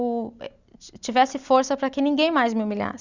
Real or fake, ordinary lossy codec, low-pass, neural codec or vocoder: real; Opus, 64 kbps; 7.2 kHz; none